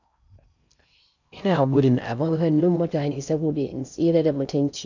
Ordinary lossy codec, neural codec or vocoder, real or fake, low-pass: none; codec, 16 kHz in and 24 kHz out, 0.6 kbps, FocalCodec, streaming, 4096 codes; fake; 7.2 kHz